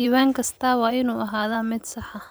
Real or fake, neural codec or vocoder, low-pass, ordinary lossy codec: fake; vocoder, 44.1 kHz, 128 mel bands every 512 samples, BigVGAN v2; none; none